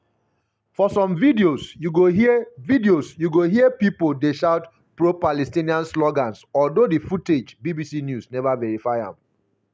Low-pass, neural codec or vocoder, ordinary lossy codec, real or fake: none; none; none; real